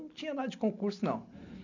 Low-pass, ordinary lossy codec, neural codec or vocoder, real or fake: 7.2 kHz; none; none; real